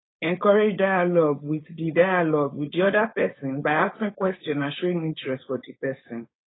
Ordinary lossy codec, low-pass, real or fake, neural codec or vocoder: AAC, 16 kbps; 7.2 kHz; fake; codec, 16 kHz, 4.8 kbps, FACodec